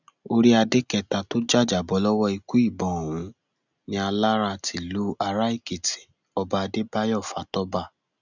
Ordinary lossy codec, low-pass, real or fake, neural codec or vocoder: none; 7.2 kHz; real; none